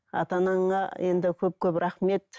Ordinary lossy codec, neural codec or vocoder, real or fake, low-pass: none; none; real; none